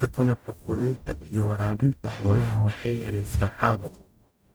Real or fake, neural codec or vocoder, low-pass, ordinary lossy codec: fake; codec, 44.1 kHz, 0.9 kbps, DAC; none; none